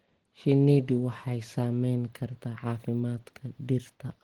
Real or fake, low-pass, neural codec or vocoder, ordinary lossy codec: real; 19.8 kHz; none; Opus, 16 kbps